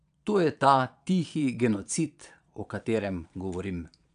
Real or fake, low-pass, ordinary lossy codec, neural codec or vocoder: fake; 9.9 kHz; none; vocoder, 22.05 kHz, 80 mel bands, Vocos